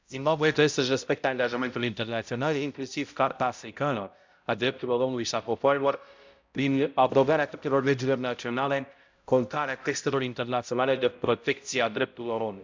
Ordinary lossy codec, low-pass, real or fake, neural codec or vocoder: MP3, 64 kbps; 7.2 kHz; fake; codec, 16 kHz, 0.5 kbps, X-Codec, HuBERT features, trained on balanced general audio